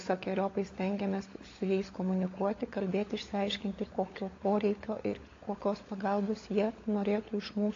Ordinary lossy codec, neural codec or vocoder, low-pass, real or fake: AAC, 32 kbps; codec, 16 kHz, 16 kbps, FunCodec, trained on LibriTTS, 50 frames a second; 7.2 kHz; fake